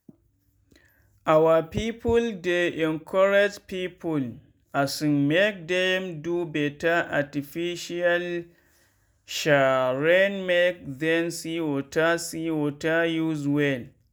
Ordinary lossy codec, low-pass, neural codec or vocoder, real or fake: none; none; none; real